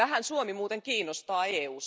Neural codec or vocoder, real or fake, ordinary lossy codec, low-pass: none; real; none; none